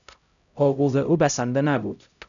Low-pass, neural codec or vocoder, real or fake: 7.2 kHz; codec, 16 kHz, 0.5 kbps, X-Codec, HuBERT features, trained on LibriSpeech; fake